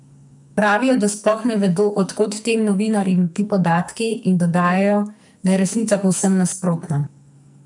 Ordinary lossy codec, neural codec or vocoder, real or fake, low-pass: none; codec, 44.1 kHz, 2.6 kbps, SNAC; fake; 10.8 kHz